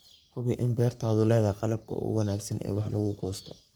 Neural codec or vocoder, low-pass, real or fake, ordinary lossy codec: codec, 44.1 kHz, 3.4 kbps, Pupu-Codec; none; fake; none